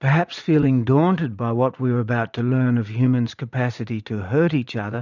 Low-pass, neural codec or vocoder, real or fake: 7.2 kHz; vocoder, 44.1 kHz, 80 mel bands, Vocos; fake